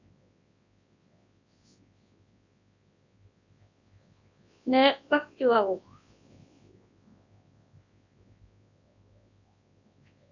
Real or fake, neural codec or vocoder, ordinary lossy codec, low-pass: fake; codec, 24 kHz, 0.9 kbps, WavTokenizer, large speech release; AAC, 48 kbps; 7.2 kHz